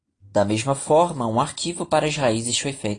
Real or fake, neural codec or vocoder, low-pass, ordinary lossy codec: real; none; 9.9 kHz; AAC, 32 kbps